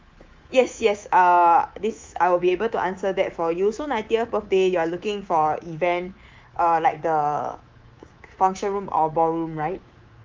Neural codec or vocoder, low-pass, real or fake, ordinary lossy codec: codec, 24 kHz, 3.1 kbps, DualCodec; 7.2 kHz; fake; Opus, 32 kbps